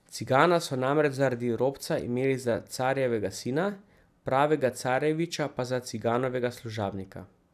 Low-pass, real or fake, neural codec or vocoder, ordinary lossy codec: 14.4 kHz; fake; vocoder, 44.1 kHz, 128 mel bands every 256 samples, BigVGAN v2; none